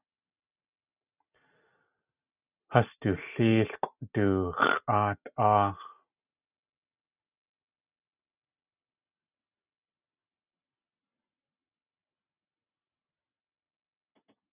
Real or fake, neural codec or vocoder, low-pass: real; none; 3.6 kHz